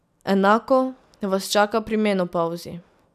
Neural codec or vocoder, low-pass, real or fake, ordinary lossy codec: none; 14.4 kHz; real; none